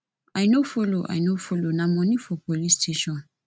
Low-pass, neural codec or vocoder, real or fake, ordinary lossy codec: none; none; real; none